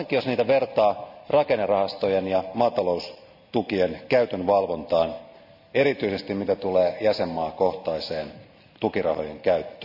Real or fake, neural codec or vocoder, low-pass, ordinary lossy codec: real; none; 5.4 kHz; none